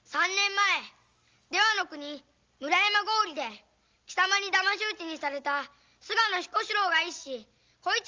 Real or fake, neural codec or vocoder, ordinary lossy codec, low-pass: real; none; Opus, 32 kbps; 7.2 kHz